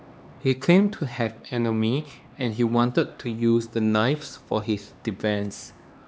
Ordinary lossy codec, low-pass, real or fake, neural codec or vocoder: none; none; fake; codec, 16 kHz, 2 kbps, X-Codec, HuBERT features, trained on LibriSpeech